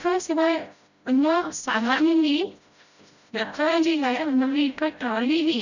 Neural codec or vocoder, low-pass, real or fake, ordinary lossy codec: codec, 16 kHz, 0.5 kbps, FreqCodec, smaller model; 7.2 kHz; fake; none